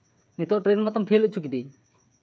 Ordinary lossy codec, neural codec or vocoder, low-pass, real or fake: none; codec, 16 kHz, 8 kbps, FreqCodec, smaller model; none; fake